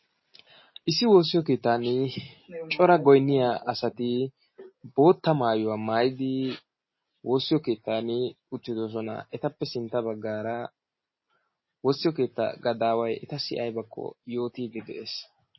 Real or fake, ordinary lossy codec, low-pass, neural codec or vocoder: real; MP3, 24 kbps; 7.2 kHz; none